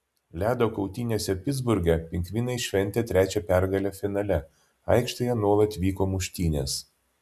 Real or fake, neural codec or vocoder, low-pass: real; none; 14.4 kHz